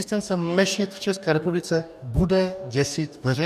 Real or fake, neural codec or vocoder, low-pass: fake; codec, 44.1 kHz, 2.6 kbps, DAC; 14.4 kHz